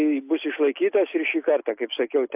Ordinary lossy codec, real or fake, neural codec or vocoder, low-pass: AAC, 32 kbps; real; none; 3.6 kHz